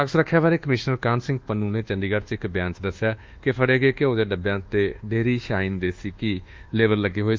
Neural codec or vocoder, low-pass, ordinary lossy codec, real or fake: codec, 24 kHz, 1.2 kbps, DualCodec; 7.2 kHz; Opus, 32 kbps; fake